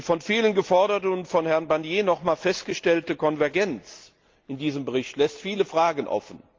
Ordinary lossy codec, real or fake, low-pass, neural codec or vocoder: Opus, 24 kbps; real; 7.2 kHz; none